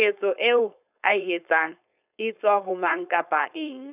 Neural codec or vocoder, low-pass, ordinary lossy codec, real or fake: codec, 16 kHz, 4.8 kbps, FACodec; 3.6 kHz; none; fake